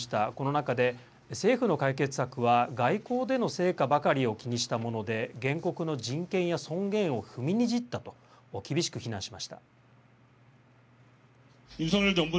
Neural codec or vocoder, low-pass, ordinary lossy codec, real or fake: none; none; none; real